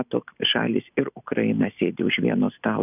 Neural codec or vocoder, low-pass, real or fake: none; 3.6 kHz; real